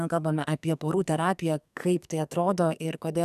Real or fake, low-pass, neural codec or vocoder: fake; 14.4 kHz; codec, 44.1 kHz, 2.6 kbps, SNAC